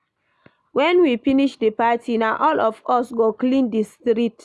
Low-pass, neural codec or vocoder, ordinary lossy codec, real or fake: none; none; none; real